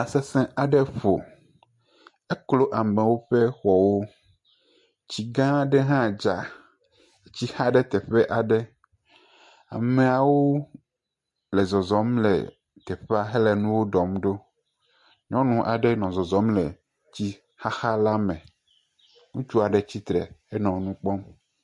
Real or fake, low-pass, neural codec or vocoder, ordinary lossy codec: real; 10.8 kHz; none; MP3, 48 kbps